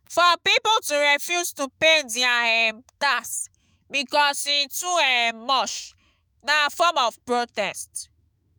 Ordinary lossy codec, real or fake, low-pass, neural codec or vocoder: none; fake; none; autoencoder, 48 kHz, 128 numbers a frame, DAC-VAE, trained on Japanese speech